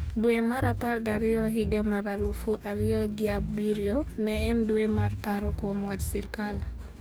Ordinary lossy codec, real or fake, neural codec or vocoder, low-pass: none; fake; codec, 44.1 kHz, 2.6 kbps, DAC; none